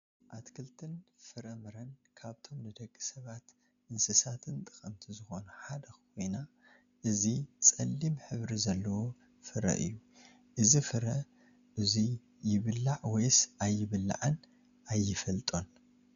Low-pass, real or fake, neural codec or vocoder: 7.2 kHz; real; none